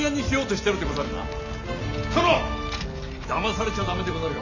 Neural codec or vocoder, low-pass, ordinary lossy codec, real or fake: none; 7.2 kHz; none; real